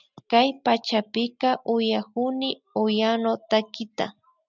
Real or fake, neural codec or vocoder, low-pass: real; none; 7.2 kHz